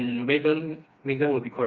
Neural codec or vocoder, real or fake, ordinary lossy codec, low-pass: codec, 16 kHz, 2 kbps, FreqCodec, smaller model; fake; Opus, 64 kbps; 7.2 kHz